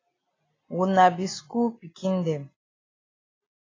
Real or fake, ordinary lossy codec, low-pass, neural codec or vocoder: real; AAC, 32 kbps; 7.2 kHz; none